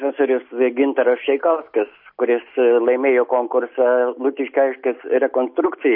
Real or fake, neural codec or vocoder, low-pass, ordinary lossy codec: real; none; 5.4 kHz; MP3, 32 kbps